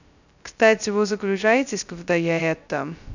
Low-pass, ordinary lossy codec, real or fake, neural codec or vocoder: 7.2 kHz; none; fake; codec, 16 kHz, 0.2 kbps, FocalCodec